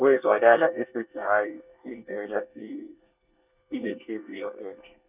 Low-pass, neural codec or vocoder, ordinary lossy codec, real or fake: 3.6 kHz; codec, 24 kHz, 1 kbps, SNAC; none; fake